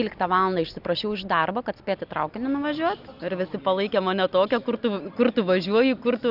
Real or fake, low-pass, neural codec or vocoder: real; 5.4 kHz; none